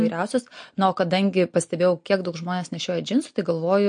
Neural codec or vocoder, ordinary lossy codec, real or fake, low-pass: none; MP3, 48 kbps; real; 9.9 kHz